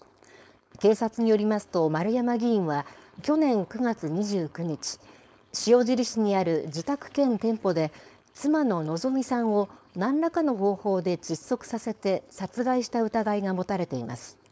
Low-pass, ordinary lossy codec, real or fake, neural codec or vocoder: none; none; fake; codec, 16 kHz, 4.8 kbps, FACodec